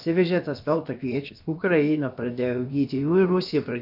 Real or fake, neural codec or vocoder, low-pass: fake; codec, 16 kHz, about 1 kbps, DyCAST, with the encoder's durations; 5.4 kHz